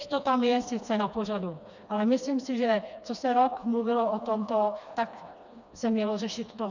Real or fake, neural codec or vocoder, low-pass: fake; codec, 16 kHz, 2 kbps, FreqCodec, smaller model; 7.2 kHz